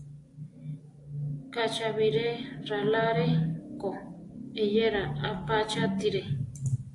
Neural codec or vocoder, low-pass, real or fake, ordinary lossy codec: none; 10.8 kHz; real; AAC, 48 kbps